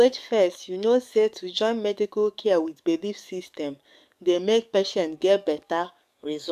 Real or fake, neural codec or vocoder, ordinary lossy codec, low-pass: fake; codec, 44.1 kHz, 7.8 kbps, DAC; none; 14.4 kHz